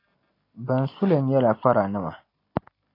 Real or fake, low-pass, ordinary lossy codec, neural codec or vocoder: real; 5.4 kHz; AAC, 24 kbps; none